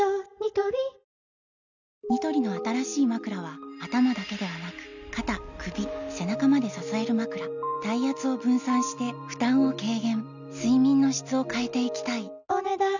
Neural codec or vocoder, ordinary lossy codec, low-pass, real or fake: none; none; 7.2 kHz; real